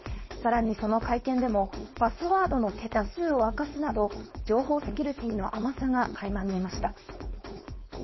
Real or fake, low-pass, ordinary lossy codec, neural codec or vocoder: fake; 7.2 kHz; MP3, 24 kbps; codec, 16 kHz, 4.8 kbps, FACodec